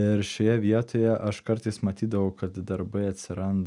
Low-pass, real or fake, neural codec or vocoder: 10.8 kHz; real; none